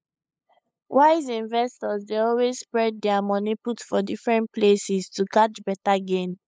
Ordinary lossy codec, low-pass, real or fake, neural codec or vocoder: none; none; fake; codec, 16 kHz, 8 kbps, FunCodec, trained on LibriTTS, 25 frames a second